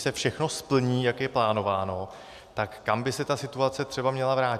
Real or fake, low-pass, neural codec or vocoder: real; 14.4 kHz; none